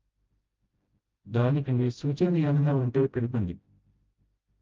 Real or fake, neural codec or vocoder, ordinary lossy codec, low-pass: fake; codec, 16 kHz, 0.5 kbps, FreqCodec, smaller model; Opus, 32 kbps; 7.2 kHz